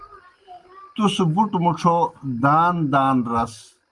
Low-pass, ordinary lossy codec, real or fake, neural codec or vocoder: 10.8 kHz; Opus, 32 kbps; real; none